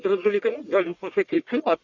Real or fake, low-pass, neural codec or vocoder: fake; 7.2 kHz; codec, 44.1 kHz, 1.7 kbps, Pupu-Codec